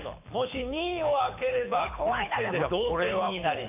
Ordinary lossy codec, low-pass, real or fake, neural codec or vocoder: none; 3.6 kHz; fake; codec, 24 kHz, 6 kbps, HILCodec